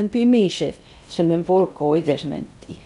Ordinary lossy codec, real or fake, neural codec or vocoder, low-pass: none; fake; codec, 16 kHz in and 24 kHz out, 0.6 kbps, FocalCodec, streaming, 2048 codes; 10.8 kHz